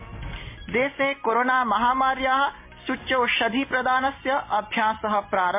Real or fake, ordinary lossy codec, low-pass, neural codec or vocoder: real; MP3, 24 kbps; 3.6 kHz; none